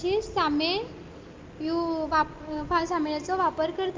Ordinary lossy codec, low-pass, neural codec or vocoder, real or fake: Opus, 32 kbps; 7.2 kHz; none; real